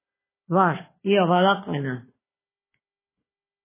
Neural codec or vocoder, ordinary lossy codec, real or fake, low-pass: codec, 16 kHz, 4 kbps, FunCodec, trained on Chinese and English, 50 frames a second; MP3, 16 kbps; fake; 3.6 kHz